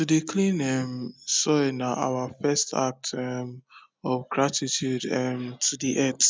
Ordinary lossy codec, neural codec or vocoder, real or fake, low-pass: none; none; real; none